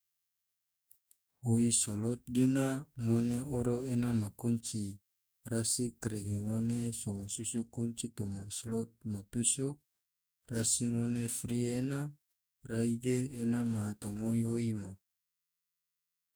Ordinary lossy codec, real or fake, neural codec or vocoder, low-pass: none; fake; codec, 44.1 kHz, 2.6 kbps, DAC; none